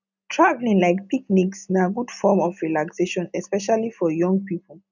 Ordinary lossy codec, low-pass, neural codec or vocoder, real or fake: none; 7.2 kHz; none; real